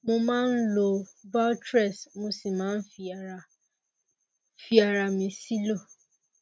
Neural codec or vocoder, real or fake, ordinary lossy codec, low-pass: none; real; none; none